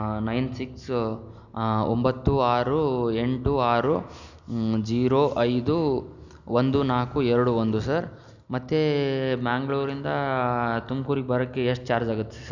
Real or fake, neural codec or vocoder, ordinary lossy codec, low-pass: real; none; none; 7.2 kHz